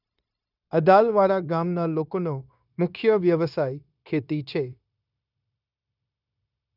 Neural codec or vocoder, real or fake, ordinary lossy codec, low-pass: codec, 16 kHz, 0.9 kbps, LongCat-Audio-Codec; fake; none; 5.4 kHz